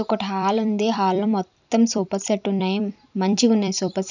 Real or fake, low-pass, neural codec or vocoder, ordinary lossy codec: fake; 7.2 kHz; vocoder, 44.1 kHz, 128 mel bands every 256 samples, BigVGAN v2; none